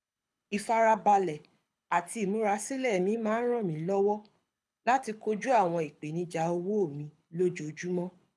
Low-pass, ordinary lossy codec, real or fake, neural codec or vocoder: none; none; fake; codec, 24 kHz, 6 kbps, HILCodec